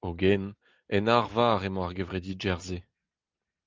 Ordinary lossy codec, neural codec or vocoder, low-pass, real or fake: Opus, 24 kbps; none; 7.2 kHz; real